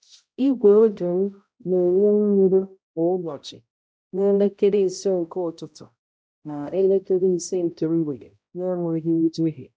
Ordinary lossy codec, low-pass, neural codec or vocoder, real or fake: none; none; codec, 16 kHz, 0.5 kbps, X-Codec, HuBERT features, trained on balanced general audio; fake